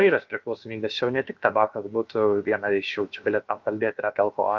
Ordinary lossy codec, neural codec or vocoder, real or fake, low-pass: Opus, 24 kbps; codec, 16 kHz, about 1 kbps, DyCAST, with the encoder's durations; fake; 7.2 kHz